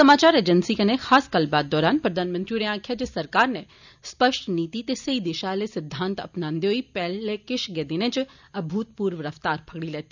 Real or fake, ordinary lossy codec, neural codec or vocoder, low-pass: real; none; none; 7.2 kHz